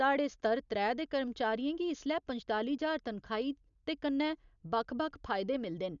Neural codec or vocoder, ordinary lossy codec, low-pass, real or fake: none; none; 7.2 kHz; real